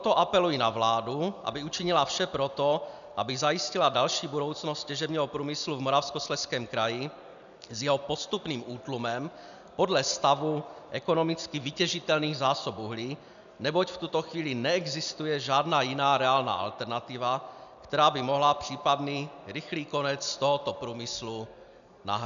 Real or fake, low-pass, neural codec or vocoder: real; 7.2 kHz; none